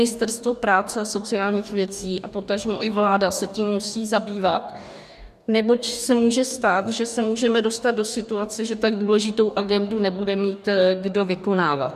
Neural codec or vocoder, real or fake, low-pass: codec, 44.1 kHz, 2.6 kbps, DAC; fake; 14.4 kHz